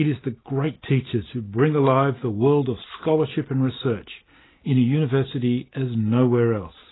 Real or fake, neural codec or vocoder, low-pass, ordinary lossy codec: real; none; 7.2 kHz; AAC, 16 kbps